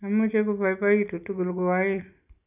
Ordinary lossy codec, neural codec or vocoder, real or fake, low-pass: none; none; real; 3.6 kHz